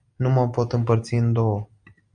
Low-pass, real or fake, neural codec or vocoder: 9.9 kHz; real; none